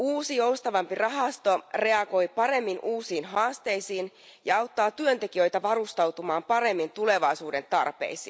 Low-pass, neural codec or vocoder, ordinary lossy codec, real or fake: none; none; none; real